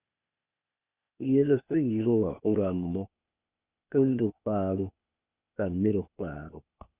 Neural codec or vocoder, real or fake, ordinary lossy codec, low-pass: codec, 16 kHz, 0.8 kbps, ZipCodec; fake; Opus, 64 kbps; 3.6 kHz